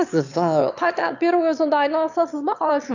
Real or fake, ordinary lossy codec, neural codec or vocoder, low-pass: fake; none; autoencoder, 22.05 kHz, a latent of 192 numbers a frame, VITS, trained on one speaker; 7.2 kHz